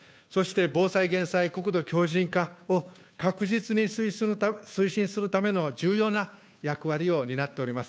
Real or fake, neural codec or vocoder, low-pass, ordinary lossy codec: fake; codec, 16 kHz, 2 kbps, FunCodec, trained on Chinese and English, 25 frames a second; none; none